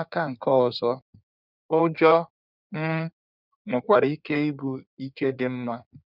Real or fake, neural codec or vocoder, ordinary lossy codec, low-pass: fake; codec, 16 kHz in and 24 kHz out, 1.1 kbps, FireRedTTS-2 codec; none; 5.4 kHz